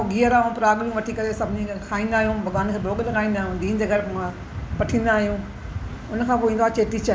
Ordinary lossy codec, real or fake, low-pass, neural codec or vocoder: none; real; none; none